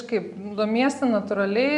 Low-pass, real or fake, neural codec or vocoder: 10.8 kHz; real; none